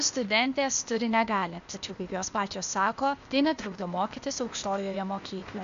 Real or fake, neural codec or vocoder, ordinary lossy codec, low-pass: fake; codec, 16 kHz, 0.8 kbps, ZipCodec; MP3, 64 kbps; 7.2 kHz